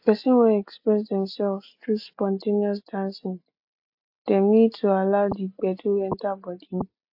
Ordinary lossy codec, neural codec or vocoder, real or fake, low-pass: AAC, 48 kbps; none; real; 5.4 kHz